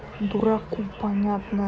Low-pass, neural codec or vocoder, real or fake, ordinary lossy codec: none; none; real; none